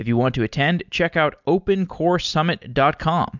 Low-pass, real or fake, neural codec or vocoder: 7.2 kHz; real; none